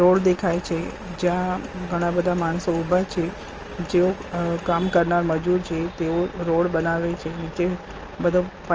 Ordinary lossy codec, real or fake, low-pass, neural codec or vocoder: Opus, 24 kbps; real; 7.2 kHz; none